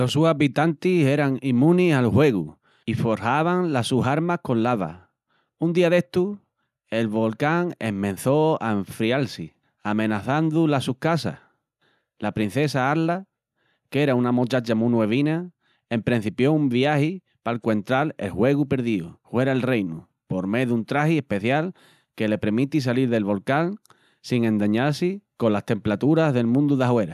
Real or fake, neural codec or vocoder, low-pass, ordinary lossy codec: real; none; 14.4 kHz; none